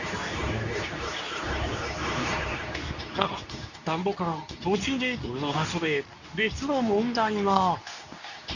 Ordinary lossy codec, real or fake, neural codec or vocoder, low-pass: none; fake; codec, 24 kHz, 0.9 kbps, WavTokenizer, medium speech release version 2; 7.2 kHz